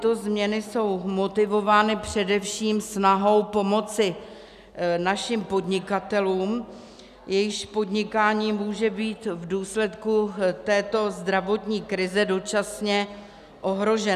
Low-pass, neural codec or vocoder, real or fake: 14.4 kHz; none; real